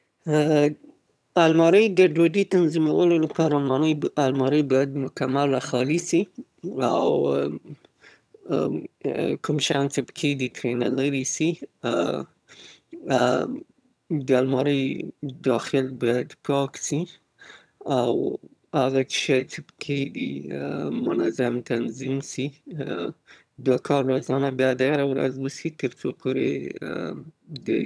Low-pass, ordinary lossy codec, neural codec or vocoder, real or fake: none; none; vocoder, 22.05 kHz, 80 mel bands, HiFi-GAN; fake